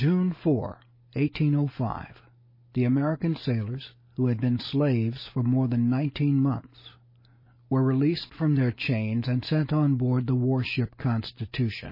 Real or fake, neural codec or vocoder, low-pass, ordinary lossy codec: real; none; 5.4 kHz; MP3, 24 kbps